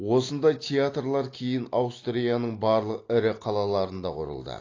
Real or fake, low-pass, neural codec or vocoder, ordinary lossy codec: real; 7.2 kHz; none; AAC, 32 kbps